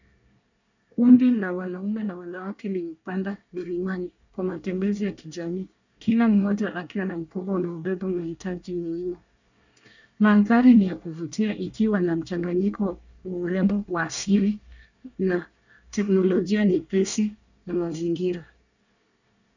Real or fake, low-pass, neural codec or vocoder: fake; 7.2 kHz; codec, 24 kHz, 1 kbps, SNAC